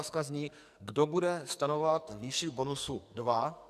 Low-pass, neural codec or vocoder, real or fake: 14.4 kHz; codec, 44.1 kHz, 2.6 kbps, SNAC; fake